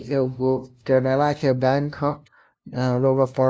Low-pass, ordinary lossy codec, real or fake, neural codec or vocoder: none; none; fake; codec, 16 kHz, 0.5 kbps, FunCodec, trained on LibriTTS, 25 frames a second